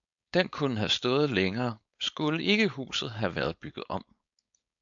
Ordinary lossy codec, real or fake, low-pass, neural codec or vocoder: AAC, 64 kbps; fake; 7.2 kHz; codec, 16 kHz, 4.8 kbps, FACodec